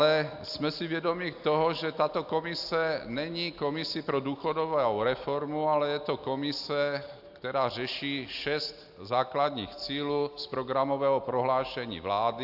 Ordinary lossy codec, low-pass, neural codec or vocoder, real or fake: AAC, 48 kbps; 5.4 kHz; none; real